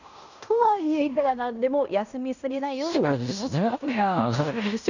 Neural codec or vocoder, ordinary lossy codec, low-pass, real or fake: codec, 16 kHz in and 24 kHz out, 0.9 kbps, LongCat-Audio-Codec, fine tuned four codebook decoder; none; 7.2 kHz; fake